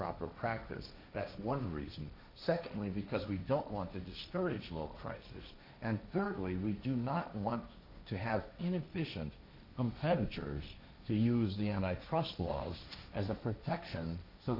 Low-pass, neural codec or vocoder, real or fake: 5.4 kHz; codec, 16 kHz, 1.1 kbps, Voila-Tokenizer; fake